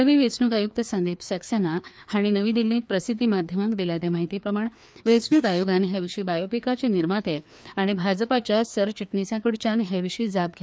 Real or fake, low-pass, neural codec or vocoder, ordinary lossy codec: fake; none; codec, 16 kHz, 2 kbps, FreqCodec, larger model; none